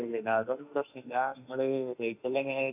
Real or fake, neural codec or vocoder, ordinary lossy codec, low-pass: fake; autoencoder, 48 kHz, 32 numbers a frame, DAC-VAE, trained on Japanese speech; none; 3.6 kHz